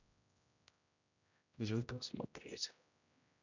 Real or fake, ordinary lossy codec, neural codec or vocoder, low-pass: fake; none; codec, 16 kHz, 0.5 kbps, X-Codec, HuBERT features, trained on general audio; 7.2 kHz